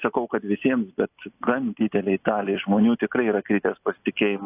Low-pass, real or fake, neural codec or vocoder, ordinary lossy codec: 3.6 kHz; real; none; AAC, 32 kbps